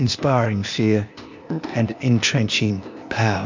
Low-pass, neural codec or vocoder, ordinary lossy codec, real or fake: 7.2 kHz; codec, 16 kHz, 0.8 kbps, ZipCodec; MP3, 64 kbps; fake